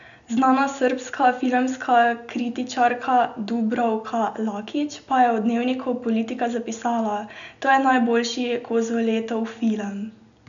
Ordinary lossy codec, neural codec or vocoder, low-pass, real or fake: none; none; 7.2 kHz; real